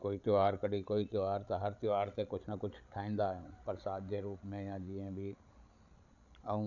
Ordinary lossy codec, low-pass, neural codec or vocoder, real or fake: none; 7.2 kHz; codec, 16 kHz, 16 kbps, FreqCodec, larger model; fake